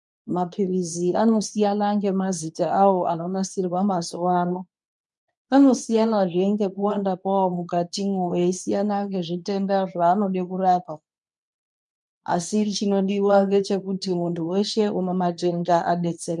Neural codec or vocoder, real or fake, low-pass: codec, 24 kHz, 0.9 kbps, WavTokenizer, medium speech release version 2; fake; 10.8 kHz